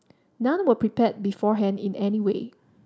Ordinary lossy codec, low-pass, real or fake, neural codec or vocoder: none; none; real; none